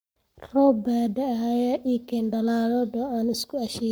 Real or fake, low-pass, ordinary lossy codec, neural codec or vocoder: fake; none; none; codec, 44.1 kHz, 7.8 kbps, Pupu-Codec